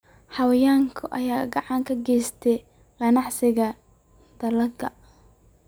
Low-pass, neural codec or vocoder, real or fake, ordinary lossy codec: none; none; real; none